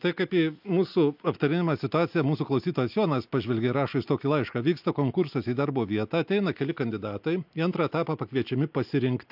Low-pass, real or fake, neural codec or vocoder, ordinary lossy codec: 5.4 kHz; real; none; MP3, 48 kbps